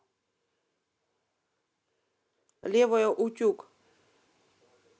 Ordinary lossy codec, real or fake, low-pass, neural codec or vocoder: none; real; none; none